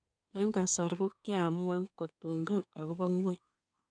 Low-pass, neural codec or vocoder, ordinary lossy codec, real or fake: 9.9 kHz; codec, 24 kHz, 1 kbps, SNAC; none; fake